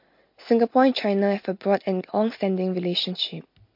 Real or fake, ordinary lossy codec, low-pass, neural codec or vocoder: real; MP3, 32 kbps; 5.4 kHz; none